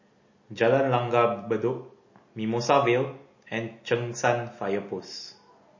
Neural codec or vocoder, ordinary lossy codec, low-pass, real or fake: none; MP3, 32 kbps; 7.2 kHz; real